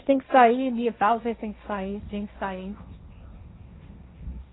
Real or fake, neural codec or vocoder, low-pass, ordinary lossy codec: fake; codec, 16 kHz, 1.1 kbps, Voila-Tokenizer; 7.2 kHz; AAC, 16 kbps